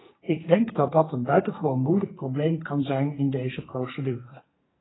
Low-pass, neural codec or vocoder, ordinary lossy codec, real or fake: 7.2 kHz; codec, 44.1 kHz, 2.6 kbps, SNAC; AAC, 16 kbps; fake